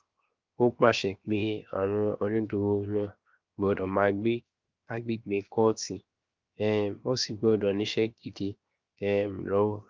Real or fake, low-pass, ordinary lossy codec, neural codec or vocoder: fake; 7.2 kHz; Opus, 32 kbps; codec, 16 kHz, 0.7 kbps, FocalCodec